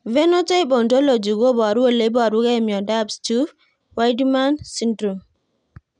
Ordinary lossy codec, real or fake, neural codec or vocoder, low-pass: none; real; none; 9.9 kHz